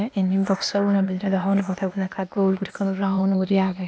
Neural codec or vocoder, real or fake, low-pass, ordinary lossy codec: codec, 16 kHz, 0.8 kbps, ZipCodec; fake; none; none